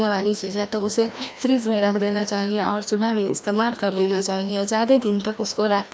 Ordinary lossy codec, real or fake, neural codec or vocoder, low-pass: none; fake; codec, 16 kHz, 1 kbps, FreqCodec, larger model; none